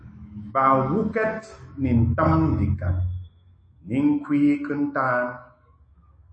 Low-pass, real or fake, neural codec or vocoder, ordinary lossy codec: 9.9 kHz; fake; autoencoder, 48 kHz, 128 numbers a frame, DAC-VAE, trained on Japanese speech; MP3, 32 kbps